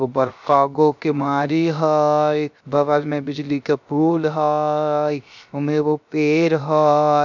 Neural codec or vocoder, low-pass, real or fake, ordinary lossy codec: codec, 16 kHz, 0.3 kbps, FocalCodec; 7.2 kHz; fake; none